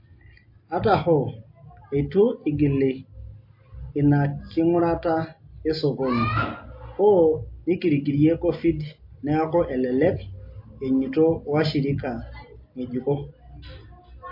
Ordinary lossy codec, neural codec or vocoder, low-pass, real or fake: MP3, 32 kbps; none; 5.4 kHz; real